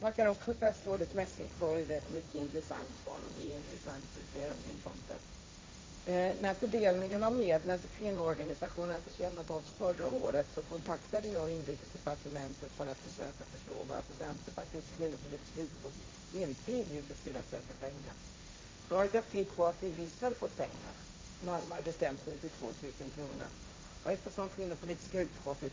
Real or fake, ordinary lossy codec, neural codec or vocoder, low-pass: fake; none; codec, 16 kHz, 1.1 kbps, Voila-Tokenizer; none